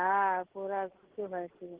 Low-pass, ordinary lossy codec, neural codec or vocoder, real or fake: 3.6 kHz; Opus, 16 kbps; none; real